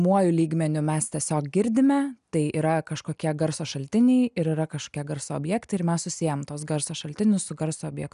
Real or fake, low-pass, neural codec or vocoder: real; 10.8 kHz; none